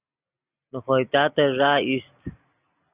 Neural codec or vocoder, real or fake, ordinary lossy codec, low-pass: none; real; Opus, 64 kbps; 3.6 kHz